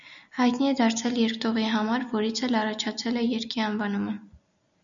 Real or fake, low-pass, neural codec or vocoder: real; 7.2 kHz; none